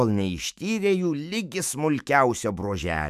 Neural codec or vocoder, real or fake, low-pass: autoencoder, 48 kHz, 128 numbers a frame, DAC-VAE, trained on Japanese speech; fake; 14.4 kHz